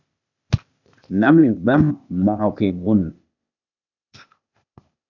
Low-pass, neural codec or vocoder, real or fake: 7.2 kHz; codec, 16 kHz, 0.8 kbps, ZipCodec; fake